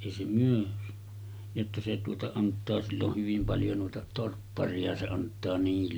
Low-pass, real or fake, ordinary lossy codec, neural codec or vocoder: none; real; none; none